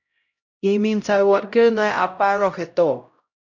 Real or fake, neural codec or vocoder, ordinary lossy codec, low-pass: fake; codec, 16 kHz, 0.5 kbps, X-Codec, HuBERT features, trained on LibriSpeech; MP3, 64 kbps; 7.2 kHz